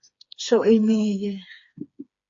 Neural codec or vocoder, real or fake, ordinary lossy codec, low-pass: codec, 16 kHz, 4 kbps, FreqCodec, smaller model; fake; AAC, 48 kbps; 7.2 kHz